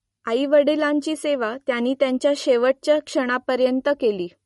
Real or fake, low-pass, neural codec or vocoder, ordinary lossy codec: real; 19.8 kHz; none; MP3, 48 kbps